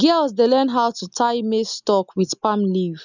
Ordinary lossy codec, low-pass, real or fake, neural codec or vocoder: none; 7.2 kHz; real; none